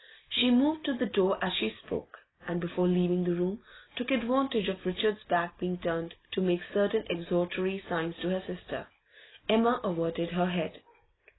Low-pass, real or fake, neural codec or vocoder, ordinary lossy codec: 7.2 kHz; real; none; AAC, 16 kbps